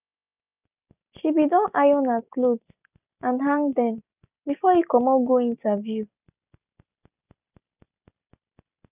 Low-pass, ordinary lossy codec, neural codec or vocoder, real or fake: 3.6 kHz; none; none; real